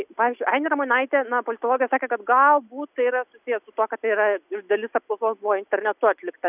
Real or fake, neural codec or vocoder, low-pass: real; none; 3.6 kHz